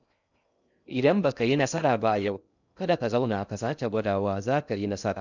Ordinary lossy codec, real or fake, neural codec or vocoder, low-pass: none; fake; codec, 16 kHz in and 24 kHz out, 0.6 kbps, FocalCodec, streaming, 2048 codes; 7.2 kHz